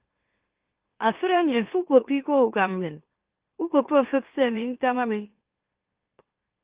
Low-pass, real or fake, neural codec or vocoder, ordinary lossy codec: 3.6 kHz; fake; autoencoder, 44.1 kHz, a latent of 192 numbers a frame, MeloTTS; Opus, 32 kbps